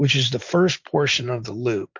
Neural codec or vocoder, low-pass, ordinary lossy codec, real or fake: vocoder, 44.1 kHz, 128 mel bands every 512 samples, BigVGAN v2; 7.2 kHz; MP3, 64 kbps; fake